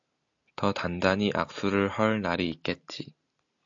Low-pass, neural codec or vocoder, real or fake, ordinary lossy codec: 7.2 kHz; none; real; AAC, 64 kbps